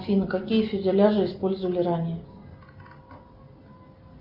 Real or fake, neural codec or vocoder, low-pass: real; none; 5.4 kHz